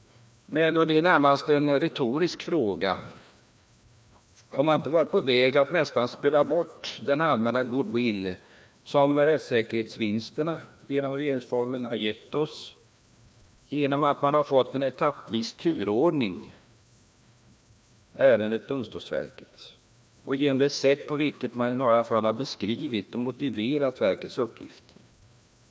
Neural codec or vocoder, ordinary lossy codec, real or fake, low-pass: codec, 16 kHz, 1 kbps, FreqCodec, larger model; none; fake; none